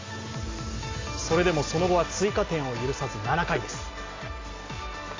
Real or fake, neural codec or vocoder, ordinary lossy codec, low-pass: real; none; AAC, 32 kbps; 7.2 kHz